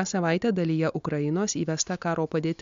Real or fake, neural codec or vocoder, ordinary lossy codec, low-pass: real; none; MP3, 64 kbps; 7.2 kHz